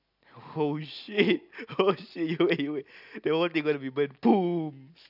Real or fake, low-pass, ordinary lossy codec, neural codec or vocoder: real; 5.4 kHz; none; none